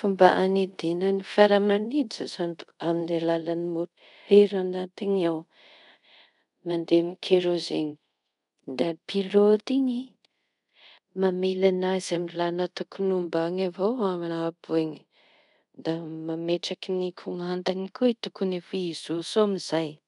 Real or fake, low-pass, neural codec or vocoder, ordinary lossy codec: fake; 10.8 kHz; codec, 24 kHz, 0.5 kbps, DualCodec; none